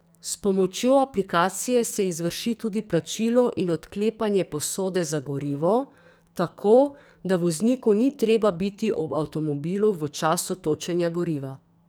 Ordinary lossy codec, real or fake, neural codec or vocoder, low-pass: none; fake; codec, 44.1 kHz, 2.6 kbps, SNAC; none